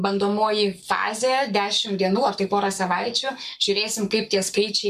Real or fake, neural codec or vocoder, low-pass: fake; codec, 44.1 kHz, 7.8 kbps, Pupu-Codec; 14.4 kHz